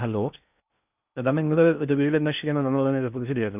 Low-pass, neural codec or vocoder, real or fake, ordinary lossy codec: 3.6 kHz; codec, 16 kHz in and 24 kHz out, 0.6 kbps, FocalCodec, streaming, 2048 codes; fake; none